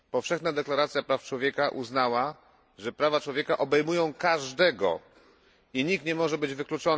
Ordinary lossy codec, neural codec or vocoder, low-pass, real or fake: none; none; none; real